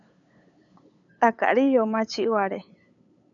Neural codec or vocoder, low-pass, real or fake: codec, 16 kHz, 8 kbps, FunCodec, trained on LibriTTS, 25 frames a second; 7.2 kHz; fake